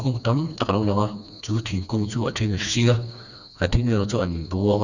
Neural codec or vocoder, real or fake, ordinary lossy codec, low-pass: codec, 16 kHz, 2 kbps, FreqCodec, smaller model; fake; none; 7.2 kHz